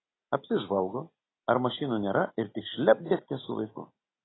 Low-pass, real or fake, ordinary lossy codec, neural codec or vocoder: 7.2 kHz; real; AAC, 16 kbps; none